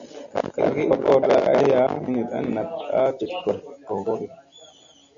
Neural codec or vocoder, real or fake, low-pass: none; real; 7.2 kHz